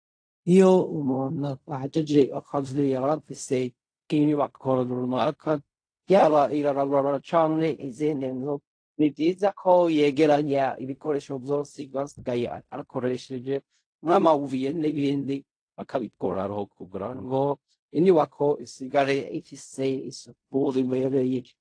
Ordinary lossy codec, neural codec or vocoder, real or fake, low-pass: AAC, 48 kbps; codec, 16 kHz in and 24 kHz out, 0.4 kbps, LongCat-Audio-Codec, fine tuned four codebook decoder; fake; 9.9 kHz